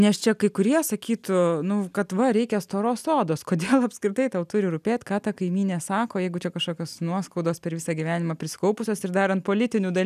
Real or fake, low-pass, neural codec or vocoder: real; 14.4 kHz; none